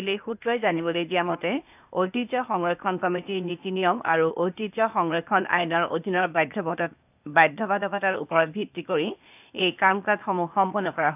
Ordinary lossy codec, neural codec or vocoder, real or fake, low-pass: none; codec, 16 kHz, 0.8 kbps, ZipCodec; fake; 3.6 kHz